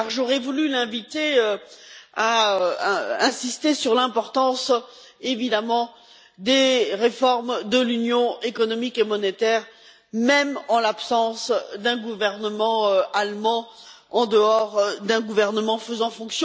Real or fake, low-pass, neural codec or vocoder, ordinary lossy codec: real; none; none; none